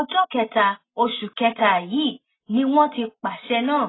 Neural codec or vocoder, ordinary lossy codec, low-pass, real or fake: none; AAC, 16 kbps; 7.2 kHz; real